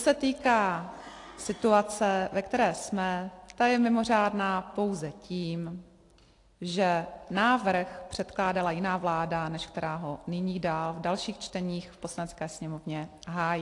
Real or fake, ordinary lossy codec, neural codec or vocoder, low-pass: real; AAC, 48 kbps; none; 10.8 kHz